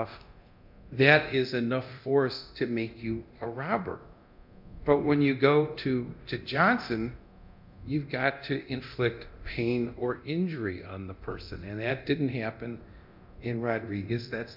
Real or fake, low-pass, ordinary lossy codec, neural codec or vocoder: fake; 5.4 kHz; MP3, 48 kbps; codec, 24 kHz, 0.9 kbps, DualCodec